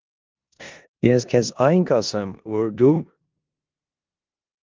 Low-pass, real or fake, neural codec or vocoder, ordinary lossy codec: 7.2 kHz; fake; codec, 16 kHz in and 24 kHz out, 0.9 kbps, LongCat-Audio-Codec, four codebook decoder; Opus, 32 kbps